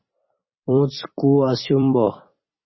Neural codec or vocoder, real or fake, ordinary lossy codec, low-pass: vocoder, 44.1 kHz, 128 mel bands every 256 samples, BigVGAN v2; fake; MP3, 24 kbps; 7.2 kHz